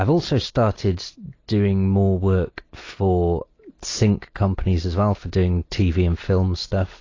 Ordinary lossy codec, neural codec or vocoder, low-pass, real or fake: AAC, 32 kbps; none; 7.2 kHz; real